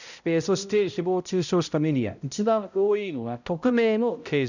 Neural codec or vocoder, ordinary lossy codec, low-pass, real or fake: codec, 16 kHz, 0.5 kbps, X-Codec, HuBERT features, trained on balanced general audio; none; 7.2 kHz; fake